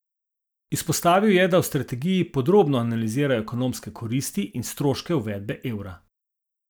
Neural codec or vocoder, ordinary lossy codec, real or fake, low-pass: none; none; real; none